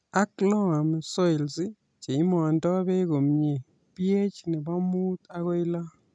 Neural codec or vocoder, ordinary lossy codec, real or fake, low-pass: none; none; real; 9.9 kHz